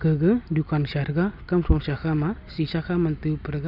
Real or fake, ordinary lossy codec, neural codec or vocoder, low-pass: real; none; none; 5.4 kHz